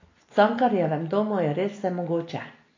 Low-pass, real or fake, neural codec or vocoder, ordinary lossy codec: 7.2 kHz; real; none; AAC, 32 kbps